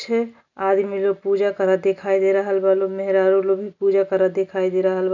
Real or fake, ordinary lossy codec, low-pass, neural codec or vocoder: real; none; 7.2 kHz; none